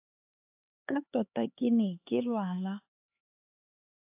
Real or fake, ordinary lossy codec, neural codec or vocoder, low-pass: fake; AAC, 32 kbps; codec, 16 kHz, 4 kbps, X-Codec, HuBERT features, trained on LibriSpeech; 3.6 kHz